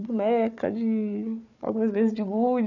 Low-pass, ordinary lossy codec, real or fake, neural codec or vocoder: 7.2 kHz; none; fake; codec, 16 kHz, 4 kbps, FunCodec, trained on Chinese and English, 50 frames a second